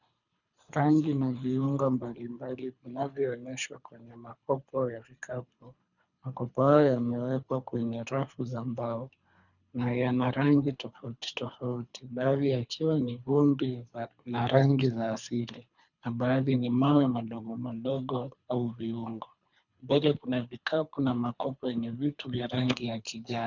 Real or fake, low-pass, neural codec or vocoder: fake; 7.2 kHz; codec, 24 kHz, 3 kbps, HILCodec